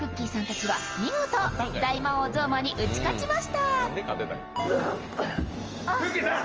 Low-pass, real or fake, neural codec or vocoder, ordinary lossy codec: 7.2 kHz; real; none; Opus, 24 kbps